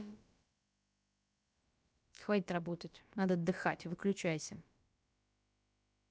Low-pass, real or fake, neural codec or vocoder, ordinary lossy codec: none; fake; codec, 16 kHz, about 1 kbps, DyCAST, with the encoder's durations; none